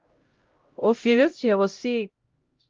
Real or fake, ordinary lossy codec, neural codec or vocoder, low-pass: fake; Opus, 32 kbps; codec, 16 kHz, 0.5 kbps, X-Codec, HuBERT features, trained on LibriSpeech; 7.2 kHz